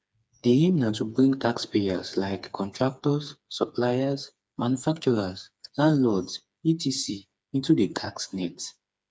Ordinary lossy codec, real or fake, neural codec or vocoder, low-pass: none; fake; codec, 16 kHz, 4 kbps, FreqCodec, smaller model; none